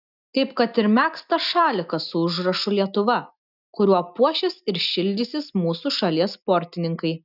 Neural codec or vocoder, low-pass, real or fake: none; 5.4 kHz; real